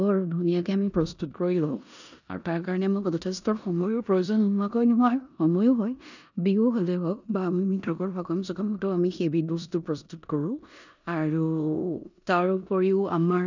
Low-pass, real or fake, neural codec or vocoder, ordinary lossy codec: 7.2 kHz; fake; codec, 16 kHz in and 24 kHz out, 0.9 kbps, LongCat-Audio-Codec, four codebook decoder; none